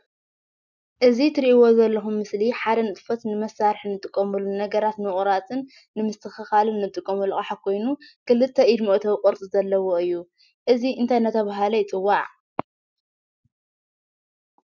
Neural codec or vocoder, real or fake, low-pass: none; real; 7.2 kHz